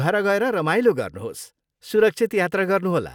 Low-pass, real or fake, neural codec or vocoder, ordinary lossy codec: 19.8 kHz; real; none; none